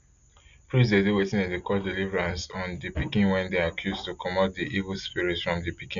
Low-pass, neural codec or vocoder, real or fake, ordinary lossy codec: 7.2 kHz; none; real; none